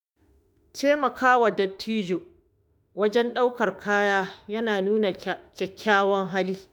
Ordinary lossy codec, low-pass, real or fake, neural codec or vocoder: none; none; fake; autoencoder, 48 kHz, 32 numbers a frame, DAC-VAE, trained on Japanese speech